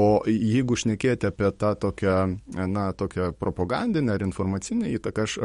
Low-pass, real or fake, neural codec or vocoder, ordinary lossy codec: 19.8 kHz; fake; codec, 44.1 kHz, 7.8 kbps, DAC; MP3, 48 kbps